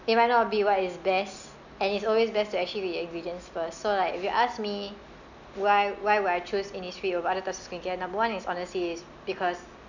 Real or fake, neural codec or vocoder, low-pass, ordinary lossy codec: real; none; 7.2 kHz; none